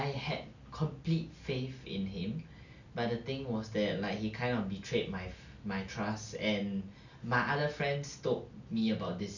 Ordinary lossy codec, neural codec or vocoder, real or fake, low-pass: none; none; real; 7.2 kHz